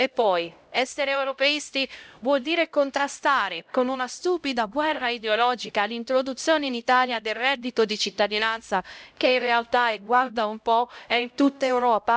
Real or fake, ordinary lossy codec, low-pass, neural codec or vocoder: fake; none; none; codec, 16 kHz, 0.5 kbps, X-Codec, HuBERT features, trained on LibriSpeech